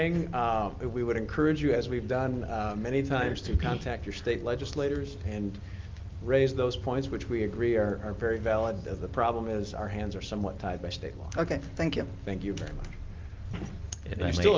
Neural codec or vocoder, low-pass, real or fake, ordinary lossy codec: none; 7.2 kHz; real; Opus, 32 kbps